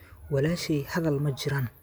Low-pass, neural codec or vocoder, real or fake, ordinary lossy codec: none; none; real; none